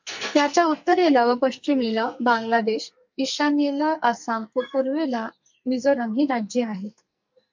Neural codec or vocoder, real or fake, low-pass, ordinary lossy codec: codec, 44.1 kHz, 2.6 kbps, SNAC; fake; 7.2 kHz; MP3, 64 kbps